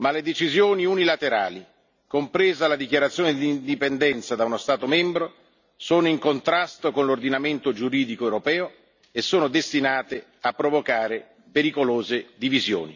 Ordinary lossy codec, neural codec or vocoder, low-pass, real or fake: none; none; 7.2 kHz; real